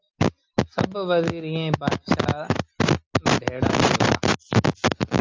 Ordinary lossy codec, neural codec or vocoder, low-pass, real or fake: Opus, 32 kbps; none; 7.2 kHz; real